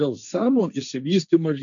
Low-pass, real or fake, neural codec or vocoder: 7.2 kHz; fake; codec, 16 kHz, 1.1 kbps, Voila-Tokenizer